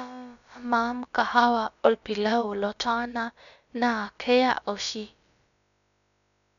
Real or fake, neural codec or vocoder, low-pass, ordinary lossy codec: fake; codec, 16 kHz, about 1 kbps, DyCAST, with the encoder's durations; 7.2 kHz; none